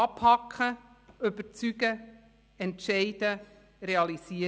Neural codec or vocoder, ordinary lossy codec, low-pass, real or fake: none; none; none; real